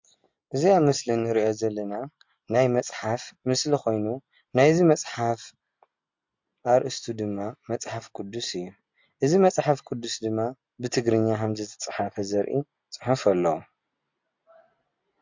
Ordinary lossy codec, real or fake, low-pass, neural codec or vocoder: MP3, 48 kbps; real; 7.2 kHz; none